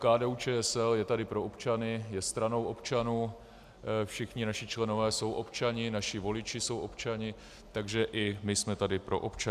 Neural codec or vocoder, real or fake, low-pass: none; real; 14.4 kHz